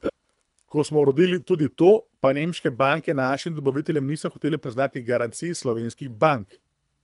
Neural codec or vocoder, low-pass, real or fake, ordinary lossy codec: codec, 24 kHz, 3 kbps, HILCodec; 10.8 kHz; fake; none